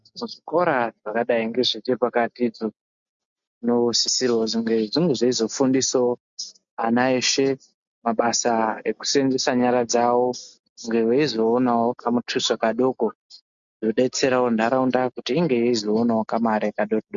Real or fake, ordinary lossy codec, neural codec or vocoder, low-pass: real; MP3, 64 kbps; none; 7.2 kHz